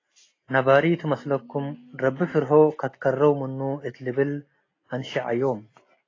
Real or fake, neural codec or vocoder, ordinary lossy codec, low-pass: real; none; AAC, 32 kbps; 7.2 kHz